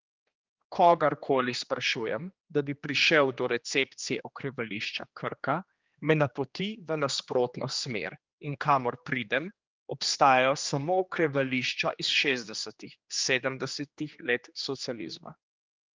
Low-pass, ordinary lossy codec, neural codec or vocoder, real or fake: 7.2 kHz; Opus, 24 kbps; codec, 16 kHz, 2 kbps, X-Codec, HuBERT features, trained on general audio; fake